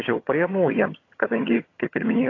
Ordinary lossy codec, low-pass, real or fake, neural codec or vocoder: AAC, 32 kbps; 7.2 kHz; fake; vocoder, 22.05 kHz, 80 mel bands, HiFi-GAN